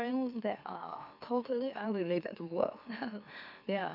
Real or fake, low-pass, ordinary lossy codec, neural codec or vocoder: fake; 5.4 kHz; none; autoencoder, 44.1 kHz, a latent of 192 numbers a frame, MeloTTS